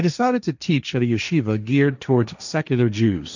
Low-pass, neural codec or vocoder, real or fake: 7.2 kHz; codec, 16 kHz, 1.1 kbps, Voila-Tokenizer; fake